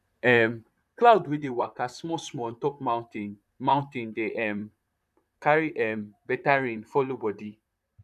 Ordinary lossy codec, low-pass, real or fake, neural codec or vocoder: none; 14.4 kHz; fake; vocoder, 44.1 kHz, 128 mel bands, Pupu-Vocoder